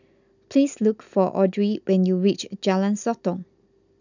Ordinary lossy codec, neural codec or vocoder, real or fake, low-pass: none; none; real; 7.2 kHz